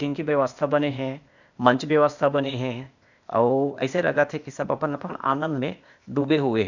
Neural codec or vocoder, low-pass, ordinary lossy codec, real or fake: codec, 16 kHz, 0.8 kbps, ZipCodec; 7.2 kHz; none; fake